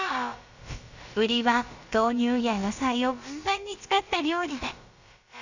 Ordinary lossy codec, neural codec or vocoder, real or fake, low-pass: Opus, 64 kbps; codec, 16 kHz, about 1 kbps, DyCAST, with the encoder's durations; fake; 7.2 kHz